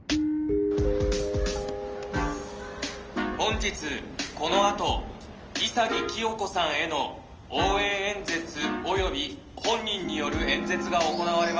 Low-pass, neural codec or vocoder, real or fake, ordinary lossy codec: 7.2 kHz; none; real; Opus, 24 kbps